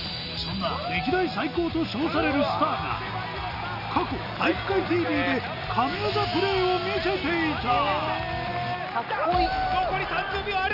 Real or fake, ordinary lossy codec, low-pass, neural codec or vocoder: real; none; 5.4 kHz; none